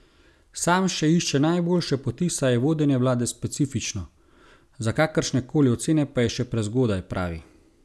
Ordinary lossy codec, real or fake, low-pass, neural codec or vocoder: none; real; none; none